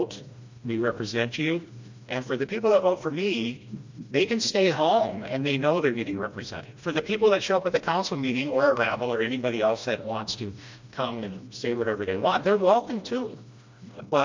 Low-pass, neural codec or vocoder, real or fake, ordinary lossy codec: 7.2 kHz; codec, 16 kHz, 1 kbps, FreqCodec, smaller model; fake; MP3, 48 kbps